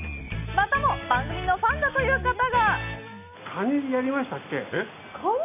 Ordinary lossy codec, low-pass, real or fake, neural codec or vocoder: none; 3.6 kHz; real; none